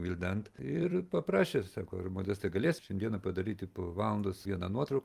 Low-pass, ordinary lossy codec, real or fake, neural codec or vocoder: 14.4 kHz; Opus, 32 kbps; fake; vocoder, 44.1 kHz, 128 mel bands every 256 samples, BigVGAN v2